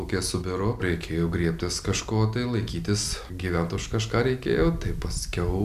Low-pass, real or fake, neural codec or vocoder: 14.4 kHz; real; none